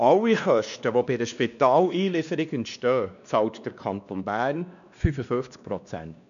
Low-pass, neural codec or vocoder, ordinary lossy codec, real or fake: 7.2 kHz; codec, 16 kHz, 2 kbps, X-Codec, WavLM features, trained on Multilingual LibriSpeech; none; fake